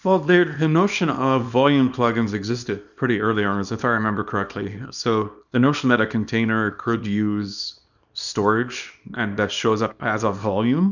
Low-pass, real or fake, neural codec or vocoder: 7.2 kHz; fake; codec, 24 kHz, 0.9 kbps, WavTokenizer, small release